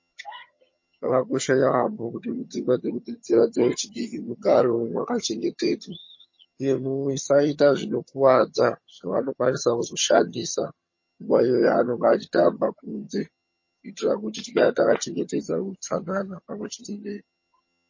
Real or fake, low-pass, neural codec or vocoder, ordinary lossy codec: fake; 7.2 kHz; vocoder, 22.05 kHz, 80 mel bands, HiFi-GAN; MP3, 32 kbps